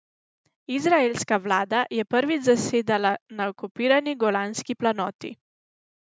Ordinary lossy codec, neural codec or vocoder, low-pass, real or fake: none; none; none; real